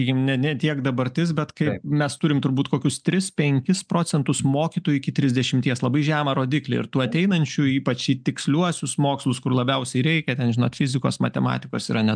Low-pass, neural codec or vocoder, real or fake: 9.9 kHz; none; real